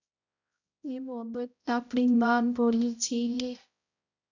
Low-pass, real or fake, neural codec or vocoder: 7.2 kHz; fake; codec, 16 kHz, 0.5 kbps, X-Codec, HuBERT features, trained on balanced general audio